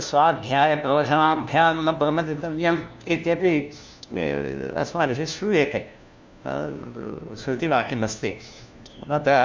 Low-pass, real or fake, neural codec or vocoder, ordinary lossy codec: none; fake; codec, 16 kHz, 1 kbps, FunCodec, trained on LibriTTS, 50 frames a second; none